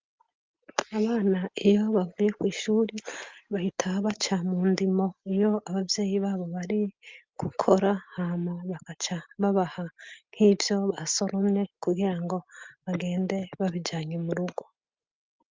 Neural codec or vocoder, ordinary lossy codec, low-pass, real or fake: none; Opus, 24 kbps; 7.2 kHz; real